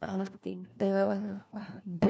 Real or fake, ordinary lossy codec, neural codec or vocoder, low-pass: fake; none; codec, 16 kHz, 1 kbps, FunCodec, trained on LibriTTS, 50 frames a second; none